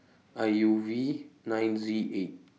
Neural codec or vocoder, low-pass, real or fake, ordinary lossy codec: none; none; real; none